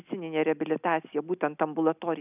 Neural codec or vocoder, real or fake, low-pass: none; real; 3.6 kHz